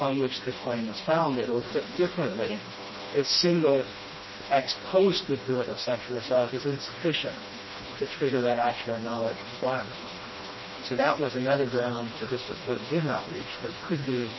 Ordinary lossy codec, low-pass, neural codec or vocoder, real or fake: MP3, 24 kbps; 7.2 kHz; codec, 16 kHz, 1 kbps, FreqCodec, smaller model; fake